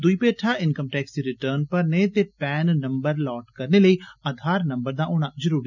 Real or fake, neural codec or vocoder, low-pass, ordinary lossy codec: real; none; 7.2 kHz; none